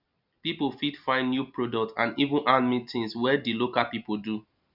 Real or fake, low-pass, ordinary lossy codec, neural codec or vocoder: real; 5.4 kHz; none; none